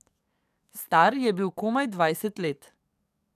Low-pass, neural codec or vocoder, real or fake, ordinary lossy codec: 14.4 kHz; codec, 44.1 kHz, 7.8 kbps, DAC; fake; none